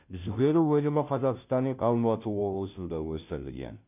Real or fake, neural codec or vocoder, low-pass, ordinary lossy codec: fake; codec, 16 kHz, 0.5 kbps, FunCodec, trained on Chinese and English, 25 frames a second; 3.6 kHz; none